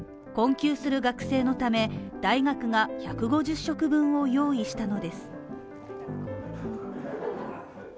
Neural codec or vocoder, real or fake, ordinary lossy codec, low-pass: none; real; none; none